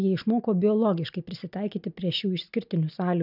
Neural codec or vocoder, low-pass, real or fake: none; 5.4 kHz; real